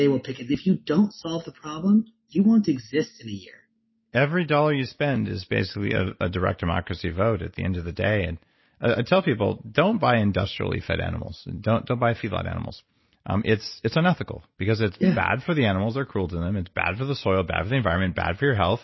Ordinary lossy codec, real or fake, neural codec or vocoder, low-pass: MP3, 24 kbps; real; none; 7.2 kHz